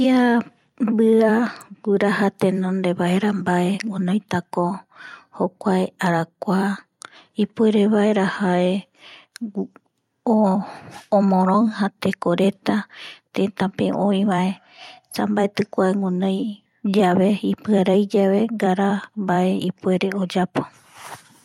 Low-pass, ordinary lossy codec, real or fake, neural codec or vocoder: 19.8 kHz; MP3, 64 kbps; fake; vocoder, 44.1 kHz, 128 mel bands every 256 samples, BigVGAN v2